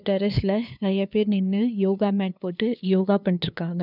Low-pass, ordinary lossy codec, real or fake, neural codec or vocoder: 5.4 kHz; none; fake; codec, 16 kHz, 2 kbps, FunCodec, trained on LibriTTS, 25 frames a second